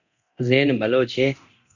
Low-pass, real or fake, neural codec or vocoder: 7.2 kHz; fake; codec, 24 kHz, 0.9 kbps, DualCodec